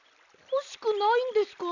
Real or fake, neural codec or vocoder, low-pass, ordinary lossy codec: real; none; 7.2 kHz; none